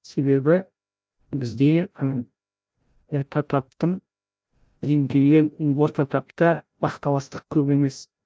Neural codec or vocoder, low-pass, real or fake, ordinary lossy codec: codec, 16 kHz, 0.5 kbps, FreqCodec, larger model; none; fake; none